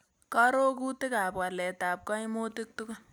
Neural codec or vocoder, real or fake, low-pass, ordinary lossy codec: none; real; none; none